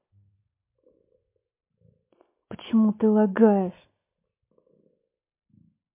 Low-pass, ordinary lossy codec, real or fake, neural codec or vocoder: 3.6 kHz; MP3, 24 kbps; real; none